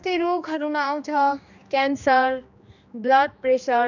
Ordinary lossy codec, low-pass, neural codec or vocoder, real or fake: none; 7.2 kHz; codec, 16 kHz, 2 kbps, X-Codec, HuBERT features, trained on general audio; fake